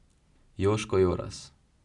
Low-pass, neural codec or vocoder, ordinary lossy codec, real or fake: 10.8 kHz; none; none; real